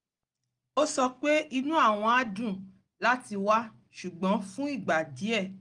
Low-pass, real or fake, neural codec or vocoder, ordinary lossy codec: 10.8 kHz; real; none; Opus, 32 kbps